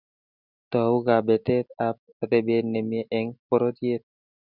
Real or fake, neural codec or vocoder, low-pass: real; none; 5.4 kHz